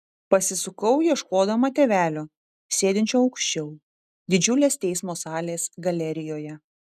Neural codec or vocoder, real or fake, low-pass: none; real; 14.4 kHz